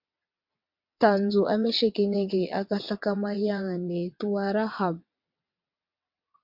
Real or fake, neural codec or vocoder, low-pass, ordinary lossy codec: fake; vocoder, 22.05 kHz, 80 mel bands, WaveNeXt; 5.4 kHz; MP3, 48 kbps